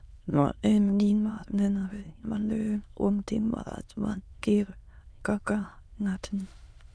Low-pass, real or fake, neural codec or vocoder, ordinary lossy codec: none; fake; autoencoder, 22.05 kHz, a latent of 192 numbers a frame, VITS, trained on many speakers; none